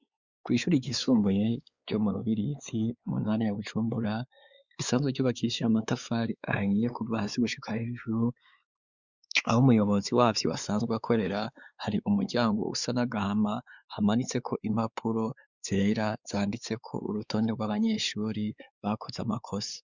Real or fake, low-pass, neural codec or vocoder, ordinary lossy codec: fake; 7.2 kHz; codec, 16 kHz, 4 kbps, X-Codec, WavLM features, trained on Multilingual LibriSpeech; Opus, 64 kbps